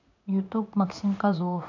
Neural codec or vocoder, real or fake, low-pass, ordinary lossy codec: codec, 16 kHz in and 24 kHz out, 1 kbps, XY-Tokenizer; fake; 7.2 kHz; none